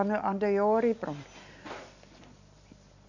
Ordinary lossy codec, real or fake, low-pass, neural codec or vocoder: none; real; 7.2 kHz; none